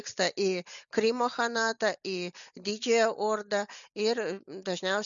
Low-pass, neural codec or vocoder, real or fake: 7.2 kHz; none; real